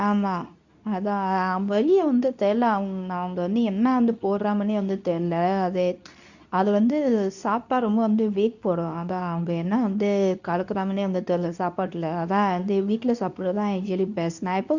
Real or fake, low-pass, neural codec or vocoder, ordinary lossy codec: fake; 7.2 kHz; codec, 24 kHz, 0.9 kbps, WavTokenizer, medium speech release version 2; none